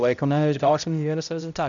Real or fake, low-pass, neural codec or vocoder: fake; 7.2 kHz; codec, 16 kHz, 0.5 kbps, X-Codec, HuBERT features, trained on balanced general audio